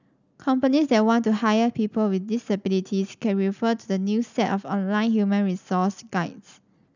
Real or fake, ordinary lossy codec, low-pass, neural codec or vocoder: real; none; 7.2 kHz; none